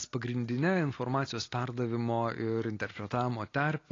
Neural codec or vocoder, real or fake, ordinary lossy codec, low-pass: none; real; AAC, 32 kbps; 7.2 kHz